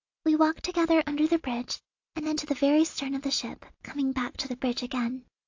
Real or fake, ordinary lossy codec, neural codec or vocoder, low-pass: real; AAC, 48 kbps; none; 7.2 kHz